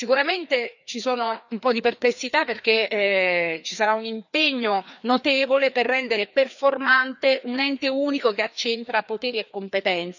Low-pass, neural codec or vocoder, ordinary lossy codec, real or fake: 7.2 kHz; codec, 16 kHz, 2 kbps, FreqCodec, larger model; none; fake